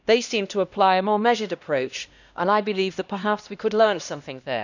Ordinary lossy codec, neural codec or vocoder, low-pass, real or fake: none; codec, 16 kHz, 1 kbps, X-Codec, HuBERT features, trained on LibriSpeech; 7.2 kHz; fake